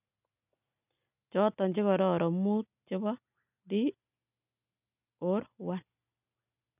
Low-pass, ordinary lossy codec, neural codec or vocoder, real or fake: 3.6 kHz; none; none; real